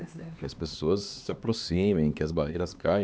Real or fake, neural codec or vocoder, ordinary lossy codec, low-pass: fake; codec, 16 kHz, 2 kbps, X-Codec, HuBERT features, trained on LibriSpeech; none; none